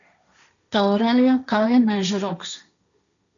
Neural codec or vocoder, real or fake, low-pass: codec, 16 kHz, 1.1 kbps, Voila-Tokenizer; fake; 7.2 kHz